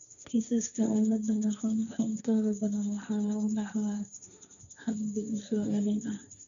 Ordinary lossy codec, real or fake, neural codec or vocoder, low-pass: none; fake; codec, 16 kHz, 1.1 kbps, Voila-Tokenizer; 7.2 kHz